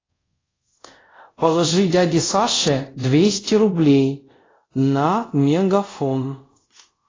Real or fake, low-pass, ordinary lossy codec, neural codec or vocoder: fake; 7.2 kHz; AAC, 32 kbps; codec, 24 kHz, 0.5 kbps, DualCodec